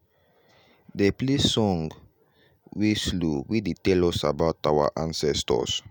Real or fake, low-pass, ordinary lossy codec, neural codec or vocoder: real; none; none; none